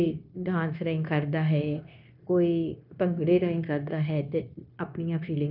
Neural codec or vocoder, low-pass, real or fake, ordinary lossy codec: codec, 16 kHz, 0.9 kbps, LongCat-Audio-Codec; 5.4 kHz; fake; none